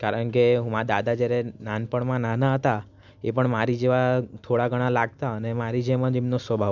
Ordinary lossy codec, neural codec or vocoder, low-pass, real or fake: none; none; 7.2 kHz; real